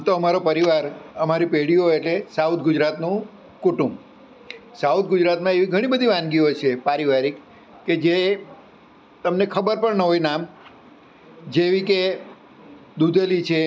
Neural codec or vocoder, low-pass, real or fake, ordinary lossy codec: none; none; real; none